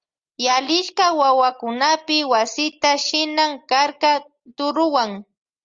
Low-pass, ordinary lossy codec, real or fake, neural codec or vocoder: 7.2 kHz; Opus, 32 kbps; real; none